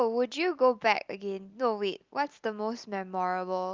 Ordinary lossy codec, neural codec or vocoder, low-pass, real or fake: Opus, 24 kbps; none; 7.2 kHz; real